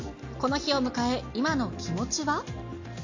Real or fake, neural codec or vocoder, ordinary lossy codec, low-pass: real; none; AAC, 48 kbps; 7.2 kHz